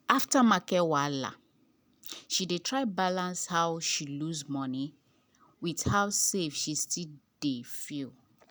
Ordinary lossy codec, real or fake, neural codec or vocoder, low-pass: none; real; none; none